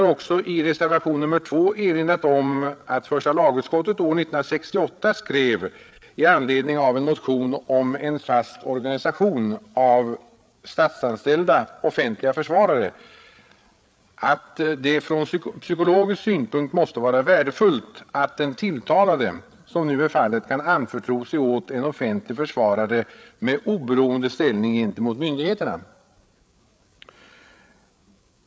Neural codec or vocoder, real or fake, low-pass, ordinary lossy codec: codec, 16 kHz, 8 kbps, FreqCodec, larger model; fake; none; none